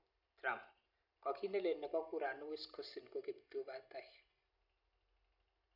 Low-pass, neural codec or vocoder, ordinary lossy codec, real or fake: 5.4 kHz; none; none; real